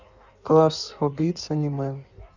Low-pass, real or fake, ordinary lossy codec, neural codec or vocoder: 7.2 kHz; fake; none; codec, 16 kHz in and 24 kHz out, 1.1 kbps, FireRedTTS-2 codec